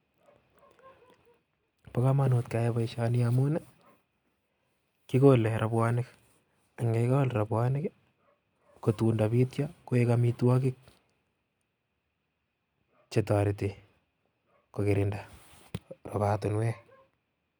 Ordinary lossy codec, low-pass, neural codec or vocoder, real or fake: none; 19.8 kHz; none; real